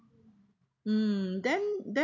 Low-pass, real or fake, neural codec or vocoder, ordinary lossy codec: 7.2 kHz; real; none; none